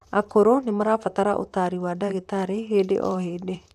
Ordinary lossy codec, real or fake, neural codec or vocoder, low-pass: none; fake; vocoder, 44.1 kHz, 128 mel bands, Pupu-Vocoder; 14.4 kHz